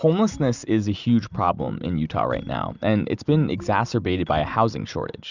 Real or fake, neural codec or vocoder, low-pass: real; none; 7.2 kHz